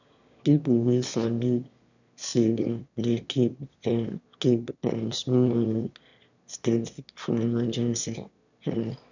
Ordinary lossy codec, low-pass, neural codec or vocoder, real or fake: none; 7.2 kHz; autoencoder, 22.05 kHz, a latent of 192 numbers a frame, VITS, trained on one speaker; fake